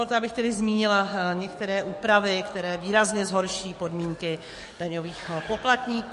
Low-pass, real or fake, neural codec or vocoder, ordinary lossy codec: 14.4 kHz; fake; codec, 44.1 kHz, 7.8 kbps, DAC; MP3, 48 kbps